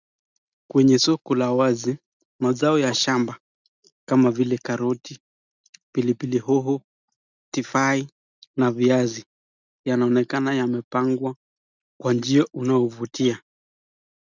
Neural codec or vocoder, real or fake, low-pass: none; real; 7.2 kHz